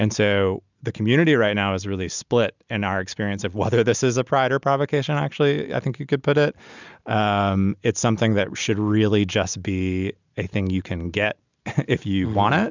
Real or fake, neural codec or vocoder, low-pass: real; none; 7.2 kHz